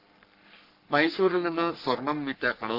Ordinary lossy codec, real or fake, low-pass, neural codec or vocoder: none; fake; 5.4 kHz; codec, 44.1 kHz, 3.4 kbps, Pupu-Codec